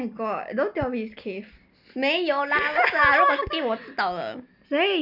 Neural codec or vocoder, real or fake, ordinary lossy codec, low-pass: none; real; none; 5.4 kHz